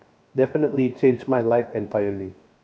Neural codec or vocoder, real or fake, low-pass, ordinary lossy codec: codec, 16 kHz, 0.7 kbps, FocalCodec; fake; none; none